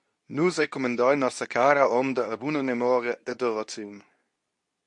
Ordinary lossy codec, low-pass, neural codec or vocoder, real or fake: MP3, 48 kbps; 10.8 kHz; codec, 24 kHz, 0.9 kbps, WavTokenizer, medium speech release version 2; fake